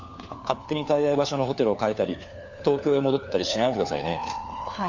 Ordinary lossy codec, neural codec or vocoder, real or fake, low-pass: none; codec, 16 kHz, 4 kbps, FunCodec, trained on LibriTTS, 50 frames a second; fake; 7.2 kHz